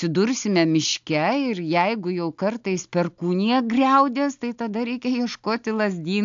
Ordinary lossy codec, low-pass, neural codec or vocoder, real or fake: AAC, 64 kbps; 7.2 kHz; none; real